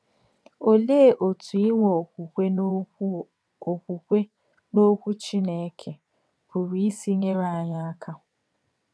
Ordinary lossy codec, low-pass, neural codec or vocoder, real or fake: none; none; vocoder, 22.05 kHz, 80 mel bands, WaveNeXt; fake